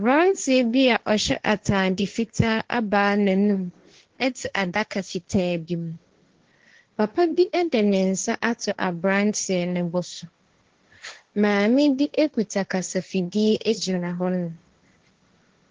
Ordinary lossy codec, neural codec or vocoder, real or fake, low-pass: Opus, 16 kbps; codec, 16 kHz, 1.1 kbps, Voila-Tokenizer; fake; 7.2 kHz